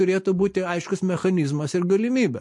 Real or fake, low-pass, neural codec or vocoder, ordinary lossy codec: real; 10.8 kHz; none; MP3, 48 kbps